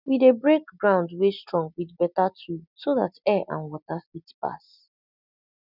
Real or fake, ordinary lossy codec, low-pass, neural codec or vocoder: real; none; 5.4 kHz; none